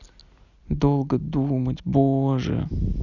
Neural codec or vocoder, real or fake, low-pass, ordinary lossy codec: none; real; 7.2 kHz; none